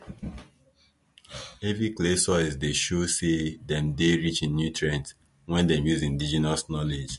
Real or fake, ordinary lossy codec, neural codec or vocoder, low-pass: real; MP3, 48 kbps; none; 14.4 kHz